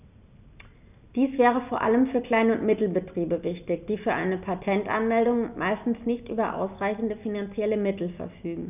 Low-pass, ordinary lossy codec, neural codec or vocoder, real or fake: 3.6 kHz; none; none; real